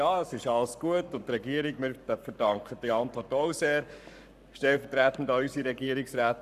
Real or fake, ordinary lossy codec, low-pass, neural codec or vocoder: fake; none; 14.4 kHz; codec, 44.1 kHz, 7.8 kbps, Pupu-Codec